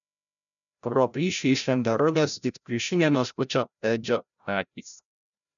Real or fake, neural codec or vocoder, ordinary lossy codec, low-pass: fake; codec, 16 kHz, 0.5 kbps, FreqCodec, larger model; MP3, 96 kbps; 7.2 kHz